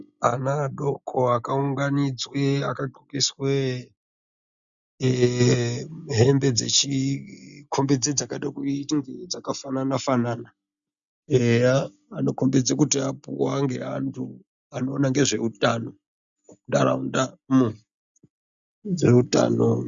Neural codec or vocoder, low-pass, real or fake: none; 7.2 kHz; real